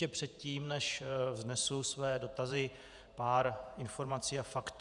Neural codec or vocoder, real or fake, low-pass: vocoder, 48 kHz, 128 mel bands, Vocos; fake; 10.8 kHz